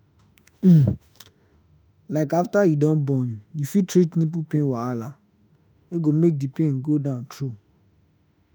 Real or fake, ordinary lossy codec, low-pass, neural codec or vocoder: fake; none; none; autoencoder, 48 kHz, 32 numbers a frame, DAC-VAE, trained on Japanese speech